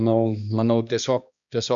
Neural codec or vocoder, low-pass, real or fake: codec, 16 kHz, 2 kbps, X-Codec, HuBERT features, trained on balanced general audio; 7.2 kHz; fake